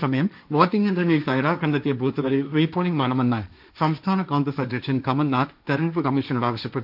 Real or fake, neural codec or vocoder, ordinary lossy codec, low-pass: fake; codec, 16 kHz, 1.1 kbps, Voila-Tokenizer; AAC, 48 kbps; 5.4 kHz